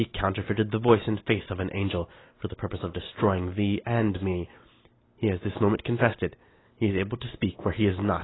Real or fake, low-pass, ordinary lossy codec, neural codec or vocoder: real; 7.2 kHz; AAC, 16 kbps; none